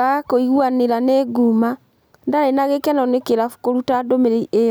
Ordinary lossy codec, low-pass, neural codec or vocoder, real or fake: none; none; none; real